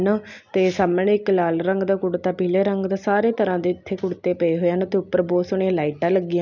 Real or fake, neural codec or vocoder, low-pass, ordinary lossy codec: real; none; 7.2 kHz; none